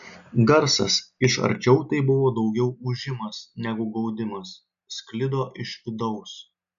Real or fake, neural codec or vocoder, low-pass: real; none; 7.2 kHz